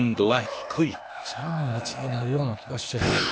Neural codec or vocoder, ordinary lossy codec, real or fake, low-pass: codec, 16 kHz, 0.8 kbps, ZipCodec; none; fake; none